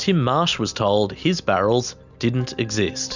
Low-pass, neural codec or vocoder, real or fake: 7.2 kHz; none; real